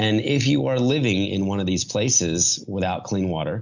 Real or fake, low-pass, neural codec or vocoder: real; 7.2 kHz; none